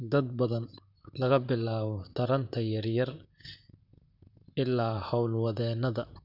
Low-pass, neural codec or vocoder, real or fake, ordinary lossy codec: 5.4 kHz; vocoder, 44.1 kHz, 128 mel bands, Pupu-Vocoder; fake; none